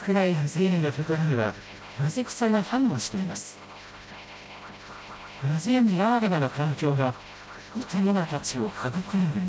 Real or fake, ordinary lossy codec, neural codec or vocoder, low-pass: fake; none; codec, 16 kHz, 0.5 kbps, FreqCodec, smaller model; none